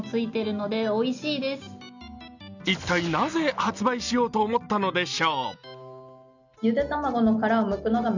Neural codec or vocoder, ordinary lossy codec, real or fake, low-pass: none; none; real; 7.2 kHz